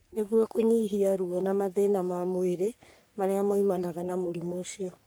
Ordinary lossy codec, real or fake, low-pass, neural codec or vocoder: none; fake; none; codec, 44.1 kHz, 3.4 kbps, Pupu-Codec